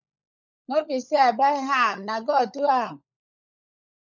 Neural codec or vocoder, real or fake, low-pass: codec, 16 kHz, 16 kbps, FunCodec, trained on LibriTTS, 50 frames a second; fake; 7.2 kHz